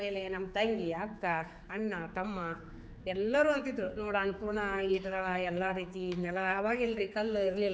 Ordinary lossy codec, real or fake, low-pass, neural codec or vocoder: none; fake; none; codec, 16 kHz, 4 kbps, X-Codec, HuBERT features, trained on general audio